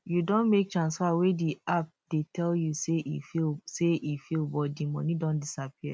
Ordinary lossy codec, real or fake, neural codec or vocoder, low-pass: none; real; none; none